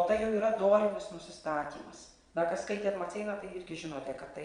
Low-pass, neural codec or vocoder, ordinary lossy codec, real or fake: 9.9 kHz; vocoder, 22.05 kHz, 80 mel bands, Vocos; Opus, 64 kbps; fake